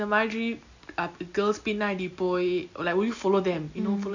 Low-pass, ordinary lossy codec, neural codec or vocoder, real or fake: 7.2 kHz; none; none; real